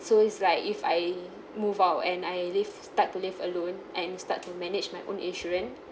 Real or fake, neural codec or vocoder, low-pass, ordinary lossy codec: real; none; none; none